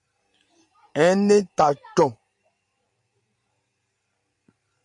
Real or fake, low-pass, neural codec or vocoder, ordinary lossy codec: fake; 10.8 kHz; vocoder, 44.1 kHz, 128 mel bands every 512 samples, BigVGAN v2; MP3, 64 kbps